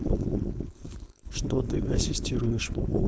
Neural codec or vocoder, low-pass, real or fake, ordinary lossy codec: codec, 16 kHz, 4.8 kbps, FACodec; none; fake; none